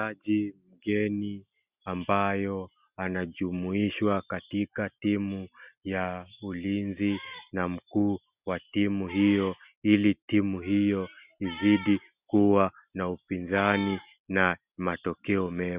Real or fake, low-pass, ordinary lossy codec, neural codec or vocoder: real; 3.6 kHz; Opus, 64 kbps; none